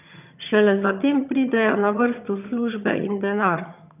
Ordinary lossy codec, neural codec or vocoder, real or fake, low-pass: none; vocoder, 22.05 kHz, 80 mel bands, HiFi-GAN; fake; 3.6 kHz